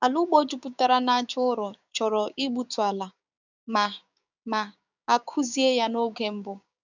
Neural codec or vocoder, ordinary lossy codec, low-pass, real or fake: codec, 16 kHz, 6 kbps, DAC; none; 7.2 kHz; fake